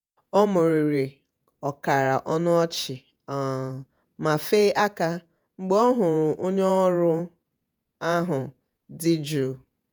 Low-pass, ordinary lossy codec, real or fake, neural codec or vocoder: none; none; fake; vocoder, 48 kHz, 128 mel bands, Vocos